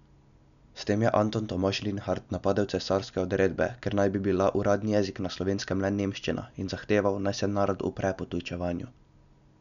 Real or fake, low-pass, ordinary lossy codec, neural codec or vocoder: real; 7.2 kHz; none; none